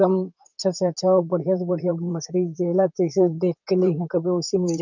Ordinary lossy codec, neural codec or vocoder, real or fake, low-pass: none; vocoder, 44.1 kHz, 128 mel bands, Pupu-Vocoder; fake; 7.2 kHz